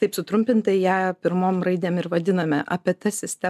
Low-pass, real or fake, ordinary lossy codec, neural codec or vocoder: 14.4 kHz; real; MP3, 96 kbps; none